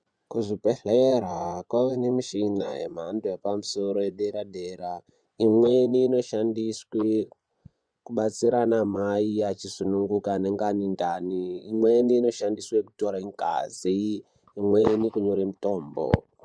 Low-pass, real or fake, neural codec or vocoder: 9.9 kHz; fake; vocoder, 24 kHz, 100 mel bands, Vocos